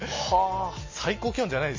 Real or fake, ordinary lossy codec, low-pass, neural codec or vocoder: real; MP3, 32 kbps; 7.2 kHz; none